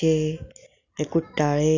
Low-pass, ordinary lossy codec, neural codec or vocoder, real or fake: 7.2 kHz; none; none; real